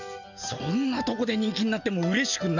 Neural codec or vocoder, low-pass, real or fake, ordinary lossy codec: none; 7.2 kHz; real; none